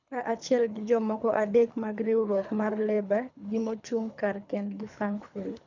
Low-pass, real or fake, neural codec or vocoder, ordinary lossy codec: 7.2 kHz; fake; codec, 24 kHz, 3 kbps, HILCodec; none